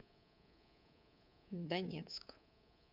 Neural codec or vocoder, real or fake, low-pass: codec, 24 kHz, 3.1 kbps, DualCodec; fake; 5.4 kHz